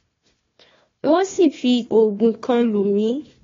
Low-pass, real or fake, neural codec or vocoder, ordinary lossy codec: 7.2 kHz; fake; codec, 16 kHz, 1 kbps, FunCodec, trained on Chinese and English, 50 frames a second; AAC, 32 kbps